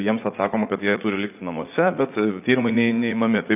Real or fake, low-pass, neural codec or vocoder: fake; 3.6 kHz; vocoder, 44.1 kHz, 80 mel bands, Vocos